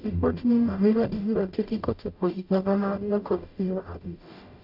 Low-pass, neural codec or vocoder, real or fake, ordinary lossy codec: 5.4 kHz; codec, 44.1 kHz, 0.9 kbps, DAC; fake; none